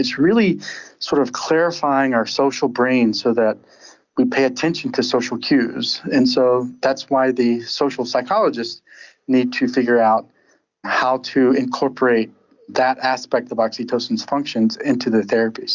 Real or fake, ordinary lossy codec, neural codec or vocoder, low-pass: real; Opus, 64 kbps; none; 7.2 kHz